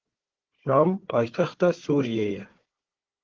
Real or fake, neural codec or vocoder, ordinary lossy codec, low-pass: fake; codec, 16 kHz, 4 kbps, FunCodec, trained on Chinese and English, 50 frames a second; Opus, 16 kbps; 7.2 kHz